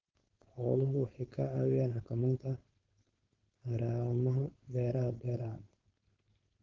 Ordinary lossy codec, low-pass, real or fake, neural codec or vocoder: Opus, 16 kbps; 7.2 kHz; fake; codec, 16 kHz, 4.8 kbps, FACodec